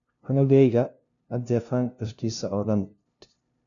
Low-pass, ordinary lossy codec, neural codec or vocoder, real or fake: 7.2 kHz; none; codec, 16 kHz, 0.5 kbps, FunCodec, trained on LibriTTS, 25 frames a second; fake